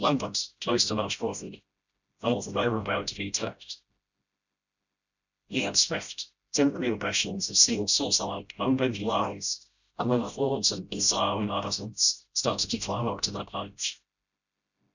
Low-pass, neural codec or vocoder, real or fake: 7.2 kHz; codec, 16 kHz, 0.5 kbps, FreqCodec, smaller model; fake